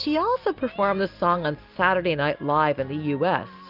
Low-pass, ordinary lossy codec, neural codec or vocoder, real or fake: 5.4 kHz; Opus, 32 kbps; none; real